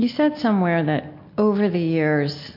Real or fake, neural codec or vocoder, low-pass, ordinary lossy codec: real; none; 5.4 kHz; MP3, 32 kbps